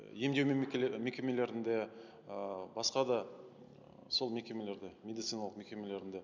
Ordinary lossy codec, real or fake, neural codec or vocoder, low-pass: MP3, 64 kbps; real; none; 7.2 kHz